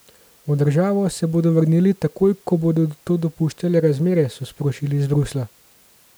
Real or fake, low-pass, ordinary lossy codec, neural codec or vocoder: real; none; none; none